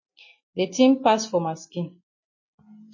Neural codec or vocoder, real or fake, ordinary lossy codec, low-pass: none; real; MP3, 32 kbps; 7.2 kHz